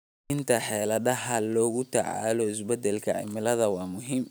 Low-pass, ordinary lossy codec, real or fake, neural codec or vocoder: none; none; real; none